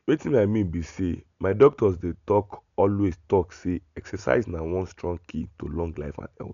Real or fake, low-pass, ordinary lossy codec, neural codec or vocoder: real; 7.2 kHz; none; none